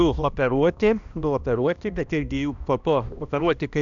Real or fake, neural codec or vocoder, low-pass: fake; codec, 16 kHz, 1 kbps, X-Codec, HuBERT features, trained on balanced general audio; 7.2 kHz